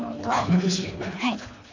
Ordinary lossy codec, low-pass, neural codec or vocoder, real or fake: MP3, 48 kbps; 7.2 kHz; codec, 24 kHz, 3 kbps, HILCodec; fake